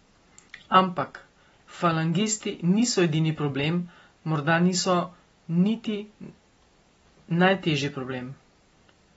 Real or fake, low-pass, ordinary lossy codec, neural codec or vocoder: real; 19.8 kHz; AAC, 24 kbps; none